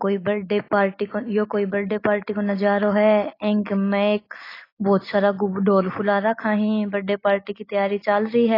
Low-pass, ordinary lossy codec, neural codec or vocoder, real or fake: 5.4 kHz; AAC, 24 kbps; none; real